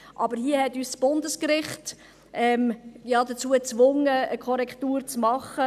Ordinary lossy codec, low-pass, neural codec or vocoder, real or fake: none; 14.4 kHz; none; real